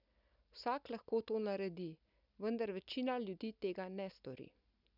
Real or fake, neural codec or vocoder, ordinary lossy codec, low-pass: real; none; none; 5.4 kHz